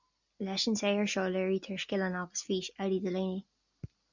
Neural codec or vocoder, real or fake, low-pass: none; real; 7.2 kHz